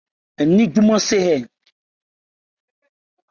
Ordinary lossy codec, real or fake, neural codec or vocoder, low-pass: Opus, 64 kbps; real; none; 7.2 kHz